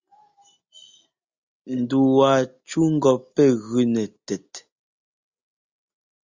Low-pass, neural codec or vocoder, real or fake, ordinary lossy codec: 7.2 kHz; none; real; Opus, 64 kbps